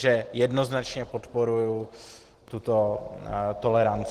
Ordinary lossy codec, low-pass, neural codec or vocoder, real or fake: Opus, 16 kbps; 14.4 kHz; none; real